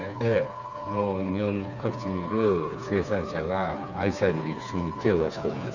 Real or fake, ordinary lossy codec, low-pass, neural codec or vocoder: fake; none; 7.2 kHz; codec, 16 kHz, 4 kbps, FreqCodec, smaller model